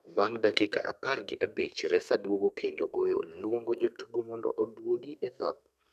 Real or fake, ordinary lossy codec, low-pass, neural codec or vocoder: fake; none; 14.4 kHz; codec, 32 kHz, 1.9 kbps, SNAC